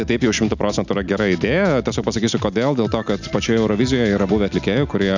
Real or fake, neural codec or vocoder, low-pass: real; none; 7.2 kHz